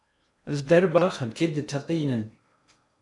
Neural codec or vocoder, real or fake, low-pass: codec, 16 kHz in and 24 kHz out, 0.6 kbps, FocalCodec, streaming, 2048 codes; fake; 10.8 kHz